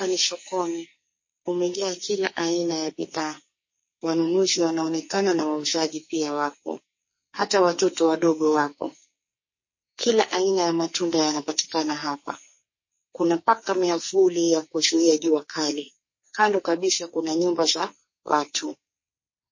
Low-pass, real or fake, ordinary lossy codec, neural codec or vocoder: 7.2 kHz; fake; MP3, 32 kbps; codec, 44.1 kHz, 2.6 kbps, SNAC